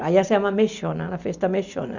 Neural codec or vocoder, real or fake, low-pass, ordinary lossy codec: none; real; 7.2 kHz; none